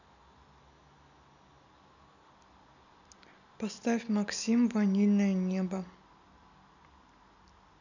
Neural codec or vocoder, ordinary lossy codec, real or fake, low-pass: none; none; real; 7.2 kHz